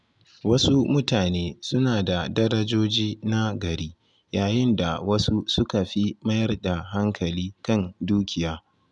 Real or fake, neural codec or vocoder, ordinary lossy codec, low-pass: real; none; none; 10.8 kHz